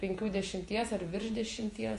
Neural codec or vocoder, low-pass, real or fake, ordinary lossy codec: vocoder, 48 kHz, 128 mel bands, Vocos; 14.4 kHz; fake; MP3, 48 kbps